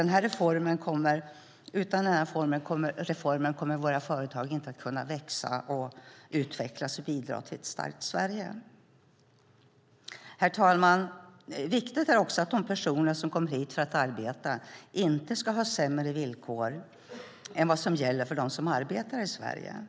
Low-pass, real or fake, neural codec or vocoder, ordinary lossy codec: none; real; none; none